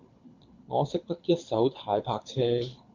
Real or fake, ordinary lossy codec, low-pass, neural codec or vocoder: fake; MP3, 64 kbps; 7.2 kHz; codec, 24 kHz, 6 kbps, HILCodec